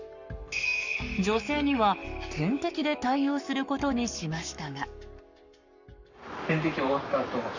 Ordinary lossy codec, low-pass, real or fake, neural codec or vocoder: none; 7.2 kHz; fake; codec, 44.1 kHz, 7.8 kbps, Pupu-Codec